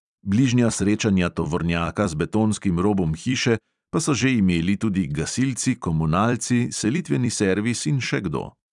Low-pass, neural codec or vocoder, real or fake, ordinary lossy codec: 10.8 kHz; vocoder, 44.1 kHz, 128 mel bands every 256 samples, BigVGAN v2; fake; none